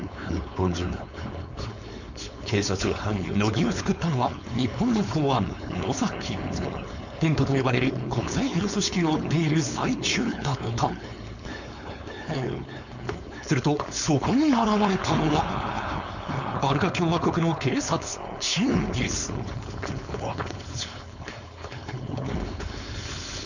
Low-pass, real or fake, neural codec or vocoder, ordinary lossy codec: 7.2 kHz; fake; codec, 16 kHz, 4.8 kbps, FACodec; none